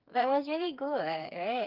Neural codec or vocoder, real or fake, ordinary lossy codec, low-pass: codec, 16 kHz, 4 kbps, FreqCodec, smaller model; fake; Opus, 32 kbps; 5.4 kHz